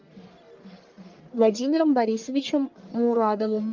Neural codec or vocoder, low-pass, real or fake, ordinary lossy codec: codec, 44.1 kHz, 1.7 kbps, Pupu-Codec; 7.2 kHz; fake; Opus, 32 kbps